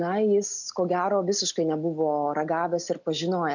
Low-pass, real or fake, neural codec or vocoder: 7.2 kHz; real; none